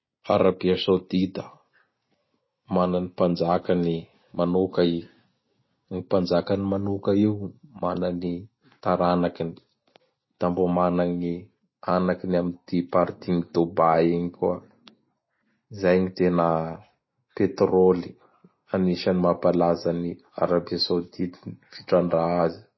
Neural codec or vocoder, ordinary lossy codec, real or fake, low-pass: none; MP3, 24 kbps; real; 7.2 kHz